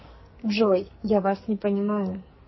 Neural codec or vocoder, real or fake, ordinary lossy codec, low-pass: codec, 44.1 kHz, 2.6 kbps, SNAC; fake; MP3, 24 kbps; 7.2 kHz